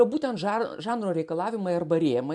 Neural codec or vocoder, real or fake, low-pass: none; real; 10.8 kHz